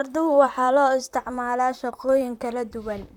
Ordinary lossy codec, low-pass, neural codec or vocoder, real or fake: none; 19.8 kHz; vocoder, 44.1 kHz, 128 mel bands, Pupu-Vocoder; fake